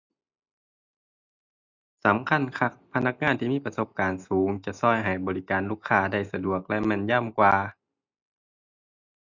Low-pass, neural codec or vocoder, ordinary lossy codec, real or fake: 7.2 kHz; none; none; real